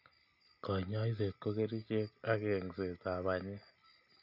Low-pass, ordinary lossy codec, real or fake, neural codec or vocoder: 5.4 kHz; none; real; none